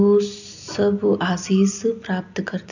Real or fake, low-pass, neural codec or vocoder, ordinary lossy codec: real; 7.2 kHz; none; none